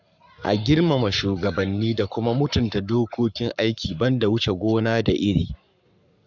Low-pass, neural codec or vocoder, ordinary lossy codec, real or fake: 7.2 kHz; codec, 44.1 kHz, 7.8 kbps, Pupu-Codec; none; fake